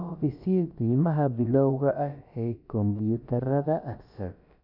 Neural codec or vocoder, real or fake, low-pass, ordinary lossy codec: codec, 16 kHz, about 1 kbps, DyCAST, with the encoder's durations; fake; 5.4 kHz; none